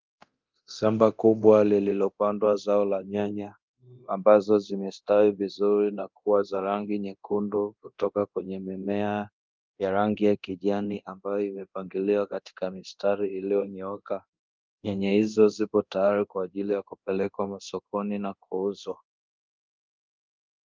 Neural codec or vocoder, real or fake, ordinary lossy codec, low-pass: codec, 24 kHz, 0.9 kbps, DualCodec; fake; Opus, 24 kbps; 7.2 kHz